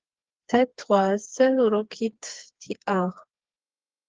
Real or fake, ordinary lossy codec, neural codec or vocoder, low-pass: fake; Opus, 16 kbps; codec, 16 kHz, 4 kbps, FreqCodec, smaller model; 7.2 kHz